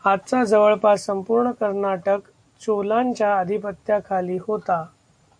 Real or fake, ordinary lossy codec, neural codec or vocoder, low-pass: real; AAC, 64 kbps; none; 9.9 kHz